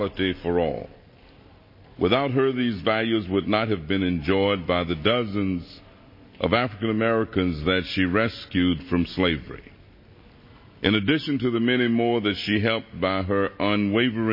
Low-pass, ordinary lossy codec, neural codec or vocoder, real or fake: 5.4 kHz; MP3, 24 kbps; none; real